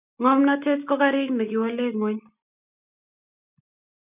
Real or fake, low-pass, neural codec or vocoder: real; 3.6 kHz; none